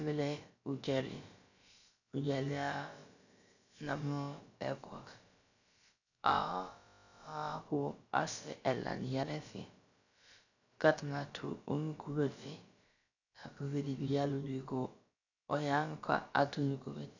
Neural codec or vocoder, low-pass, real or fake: codec, 16 kHz, about 1 kbps, DyCAST, with the encoder's durations; 7.2 kHz; fake